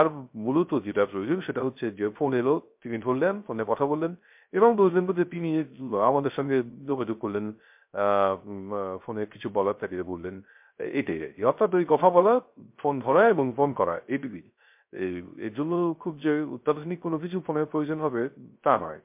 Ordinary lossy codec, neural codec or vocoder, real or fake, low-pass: MP3, 32 kbps; codec, 16 kHz, 0.3 kbps, FocalCodec; fake; 3.6 kHz